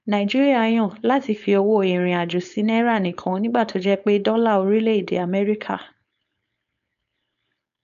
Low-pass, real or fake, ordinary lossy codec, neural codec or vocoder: 7.2 kHz; fake; none; codec, 16 kHz, 4.8 kbps, FACodec